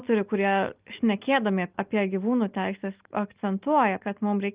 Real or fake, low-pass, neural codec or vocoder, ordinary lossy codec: real; 3.6 kHz; none; Opus, 24 kbps